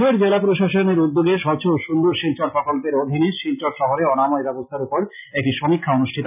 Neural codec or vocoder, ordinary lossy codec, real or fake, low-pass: none; none; real; 3.6 kHz